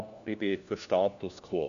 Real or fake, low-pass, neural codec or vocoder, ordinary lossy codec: fake; 7.2 kHz; codec, 16 kHz, 1 kbps, FunCodec, trained on LibriTTS, 50 frames a second; none